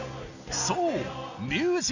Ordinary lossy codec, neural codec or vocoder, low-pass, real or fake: none; none; 7.2 kHz; real